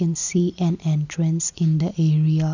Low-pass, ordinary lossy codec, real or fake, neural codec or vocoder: 7.2 kHz; none; real; none